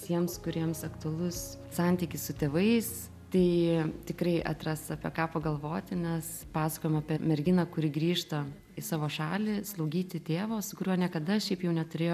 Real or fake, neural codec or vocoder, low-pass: real; none; 14.4 kHz